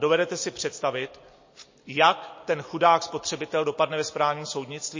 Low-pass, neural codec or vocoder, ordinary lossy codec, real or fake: 7.2 kHz; none; MP3, 32 kbps; real